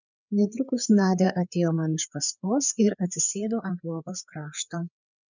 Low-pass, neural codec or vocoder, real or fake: 7.2 kHz; codec, 16 kHz, 4 kbps, FreqCodec, larger model; fake